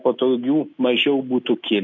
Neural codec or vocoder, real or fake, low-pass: none; real; 7.2 kHz